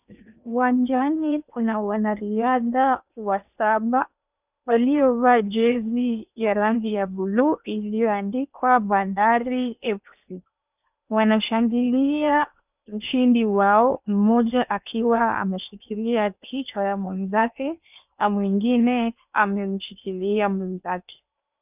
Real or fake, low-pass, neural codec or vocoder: fake; 3.6 kHz; codec, 16 kHz in and 24 kHz out, 0.8 kbps, FocalCodec, streaming, 65536 codes